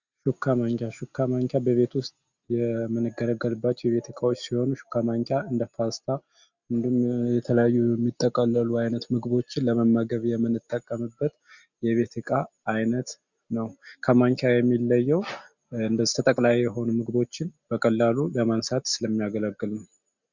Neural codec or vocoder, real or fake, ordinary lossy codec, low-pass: none; real; Opus, 64 kbps; 7.2 kHz